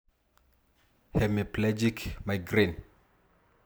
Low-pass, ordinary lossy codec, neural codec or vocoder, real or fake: none; none; none; real